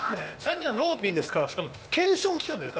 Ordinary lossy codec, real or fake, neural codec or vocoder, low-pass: none; fake; codec, 16 kHz, 0.8 kbps, ZipCodec; none